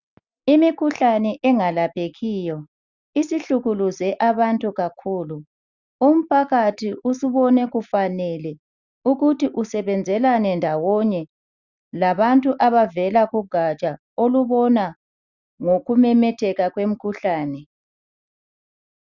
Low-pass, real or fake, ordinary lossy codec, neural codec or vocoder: 7.2 kHz; real; Opus, 64 kbps; none